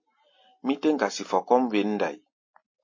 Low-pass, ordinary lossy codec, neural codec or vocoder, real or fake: 7.2 kHz; MP3, 32 kbps; none; real